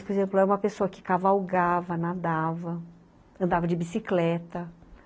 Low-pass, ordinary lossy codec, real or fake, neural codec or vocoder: none; none; real; none